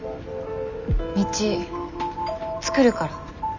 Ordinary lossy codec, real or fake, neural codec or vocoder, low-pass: none; real; none; 7.2 kHz